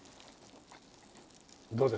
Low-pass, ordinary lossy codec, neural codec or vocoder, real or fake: none; none; none; real